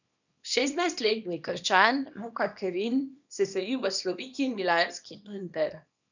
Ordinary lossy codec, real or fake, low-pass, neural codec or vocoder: none; fake; 7.2 kHz; codec, 24 kHz, 0.9 kbps, WavTokenizer, small release